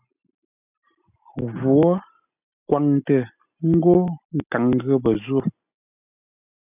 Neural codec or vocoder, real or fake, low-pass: none; real; 3.6 kHz